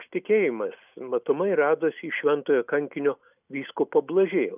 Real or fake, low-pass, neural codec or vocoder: real; 3.6 kHz; none